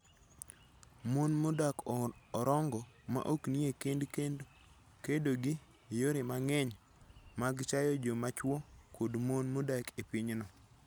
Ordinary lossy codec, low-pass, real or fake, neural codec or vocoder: none; none; real; none